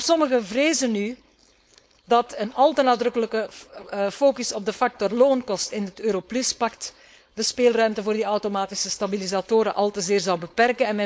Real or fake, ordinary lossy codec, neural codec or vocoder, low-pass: fake; none; codec, 16 kHz, 4.8 kbps, FACodec; none